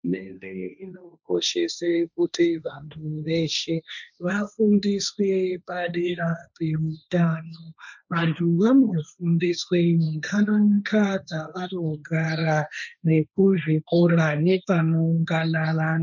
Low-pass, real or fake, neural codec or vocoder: 7.2 kHz; fake; codec, 16 kHz, 1.1 kbps, Voila-Tokenizer